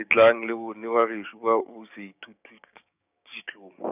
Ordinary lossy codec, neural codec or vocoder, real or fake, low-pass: none; codec, 16 kHz, 6 kbps, DAC; fake; 3.6 kHz